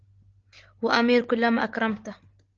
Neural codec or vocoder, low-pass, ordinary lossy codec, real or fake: none; 7.2 kHz; Opus, 16 kbps; real